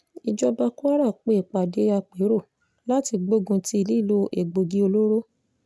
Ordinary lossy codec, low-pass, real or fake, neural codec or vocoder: none; none; real; none